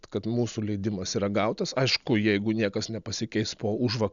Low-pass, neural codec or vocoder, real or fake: 7.2 kHz; none; real